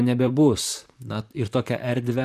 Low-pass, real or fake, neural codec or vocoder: 14.4 kHz; fake; vocoder, 44.1 kHz, 128 mel bands, Pupu-Vocoder